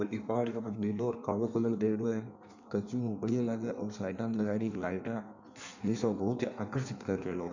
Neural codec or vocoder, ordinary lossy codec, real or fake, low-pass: codec, 16 kHz in and 24 kHz out, 1.1 kbps, FireRedTTS-2 codec; none; fake; 7.2 kHz